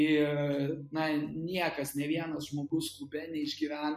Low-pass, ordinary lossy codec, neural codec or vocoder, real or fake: 14.4 kHz; MP3, 96 kbps; none; real